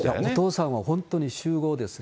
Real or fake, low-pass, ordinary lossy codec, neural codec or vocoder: real; none; none; none